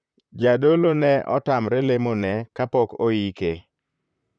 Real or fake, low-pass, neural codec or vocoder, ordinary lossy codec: fake; 9.9 kHz; vocoder, 44.1 kHz, 128 mel bands, Pupu-Vocoder; none